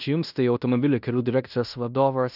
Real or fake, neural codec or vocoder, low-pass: fake; codec, 16 kHz in and 24 kHz out, 0.9 kbps, LongCat-Audio-Codec, fine tuned four codebook decoder; 5.4 kHz